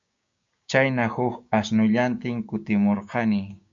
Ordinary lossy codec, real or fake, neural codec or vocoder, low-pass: MP3, 48 kbps; fake; codec, 16 kHz, 6 kbps, DAC; 7.2 kHz